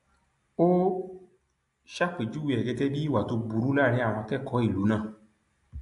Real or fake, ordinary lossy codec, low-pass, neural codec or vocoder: real; AAC, 64 kbps; 10.8 kHz; none